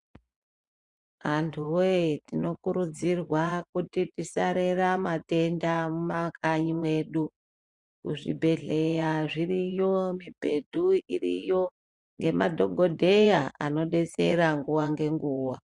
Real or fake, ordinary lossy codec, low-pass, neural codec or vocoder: fake; Opus, 64 kbps; 10.8 kHz; vocoder, 24 kHz, 100 mel bands, Vocos